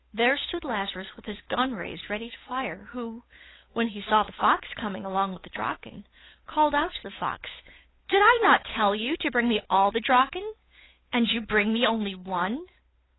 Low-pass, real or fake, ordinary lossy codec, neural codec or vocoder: 7.2 kHz; fake; AAC, 16 kbps; codec, 16 kHz, 8 kbps, FunCodec, trained on Chinese and English, 25 frames a second